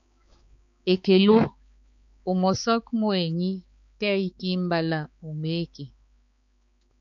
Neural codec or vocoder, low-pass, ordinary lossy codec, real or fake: codec, 16 kHz, 4 kbps, X-Codec, HuBERT features, trained on balanced general audio; 7.2 kHz; MP3, 64 kbps; fake